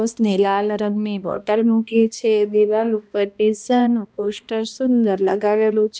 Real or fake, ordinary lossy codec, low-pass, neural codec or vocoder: fake; none; none; codec, 16 kHz, 1 kbps, X-Codec, HuBERT features, trained on balanced general audio